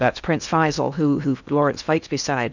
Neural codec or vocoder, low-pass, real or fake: codec, 16 kHz in and 24 kHz out, 0.8 kbps, FocalCodec, streaming, 65536 codes; 7.2 kHz; fake